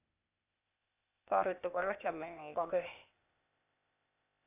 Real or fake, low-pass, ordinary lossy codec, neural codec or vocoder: fake; 3.6 kHz; none; codec, 16 kHz, 0.8 kbps, ZipCodec